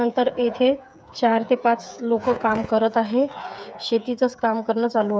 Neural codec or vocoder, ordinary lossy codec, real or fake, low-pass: codec, 16 kHz, 8 kbps, FreqCodec, smaller model; none; fake; none